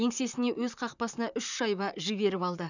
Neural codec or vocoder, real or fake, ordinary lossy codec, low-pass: vocoder, 44.1 kHz, 128 mel bands, Pupu-Vocoder; fake; none; 7.2 kHz